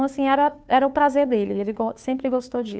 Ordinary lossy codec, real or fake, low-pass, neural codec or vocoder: none; fake; none; codec, 16 kHz, 2 kbps, FunCodec, trained on Chinese and English, 25 frames a second